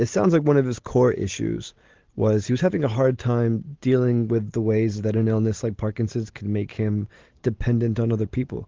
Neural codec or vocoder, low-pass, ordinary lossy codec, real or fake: none; 7.2 kHz; Opus, 16 kbps; real